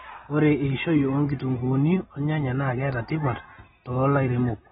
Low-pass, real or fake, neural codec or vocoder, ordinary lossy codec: 19.8 kHz; real; none; AAC, 16 kbps